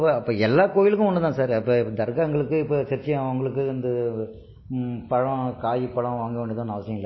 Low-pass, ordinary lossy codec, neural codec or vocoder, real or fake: 7.2 kHz; MP3, 24 kbps; none; real